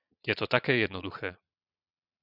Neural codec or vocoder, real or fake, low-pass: vocoder, 22.05 kHz, 80 mel bands, Vocos; fake; 5.4 kHz